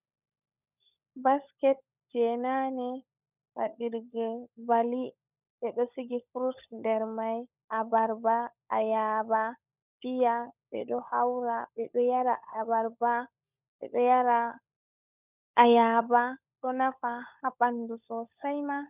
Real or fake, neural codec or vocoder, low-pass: fake; codec, 16 kHz, 16 kbps, FunCodec, trained on LibriTTS, 50 frames a second; 3.6 kHz